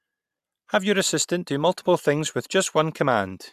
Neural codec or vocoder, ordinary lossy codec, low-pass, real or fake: none; AAC, 96 kbps; 14.4 kHz; real